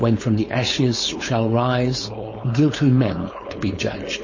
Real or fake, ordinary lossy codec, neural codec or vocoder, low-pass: fake; MP3, 32 kbps; codec, 16 kHz, 4.8 kbps, FACodec; 7.2 kHz